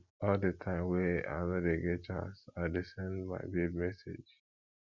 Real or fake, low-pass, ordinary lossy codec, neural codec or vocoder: real; 7.2 kHz; Opus, 64 kbps; none